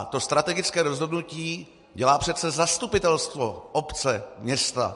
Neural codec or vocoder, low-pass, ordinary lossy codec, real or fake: none; 14.4 kHz; MP3, 48 kbps; real